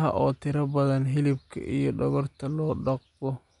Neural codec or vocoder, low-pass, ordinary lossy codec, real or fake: none; 10.8 kHz; none; real